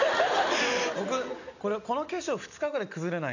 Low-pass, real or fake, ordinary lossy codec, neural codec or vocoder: 7.2 kHz; real; none; none